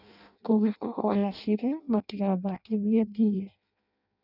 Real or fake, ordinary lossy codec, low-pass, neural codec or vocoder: fake; none; 5.4 kHz; codec, 16 kHz in and 24 kHz out, 0.6 kbps, FireRedTTS-2 codec